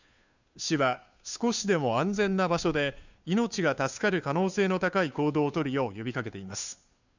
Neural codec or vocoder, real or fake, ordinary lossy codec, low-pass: codec, 16 kHz, 2 kbps, FunCodec, trained on Chinese and English, 25 frames a second; fake; none; 7.2 kHz